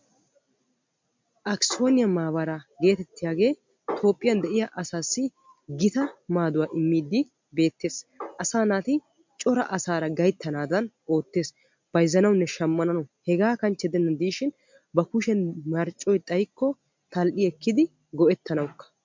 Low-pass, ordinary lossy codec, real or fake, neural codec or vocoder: 7.2 kHz; MP3, 64 kbps; real; none